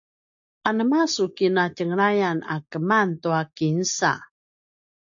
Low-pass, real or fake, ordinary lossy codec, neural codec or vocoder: 7.2 kHz; real; AAC, 64 kbps; none